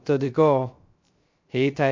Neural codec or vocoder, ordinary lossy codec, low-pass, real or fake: codec, 16 kHz, 0.3 kbps, FocalCodec; MP3, 48 kbps; 7.2 kHz; fake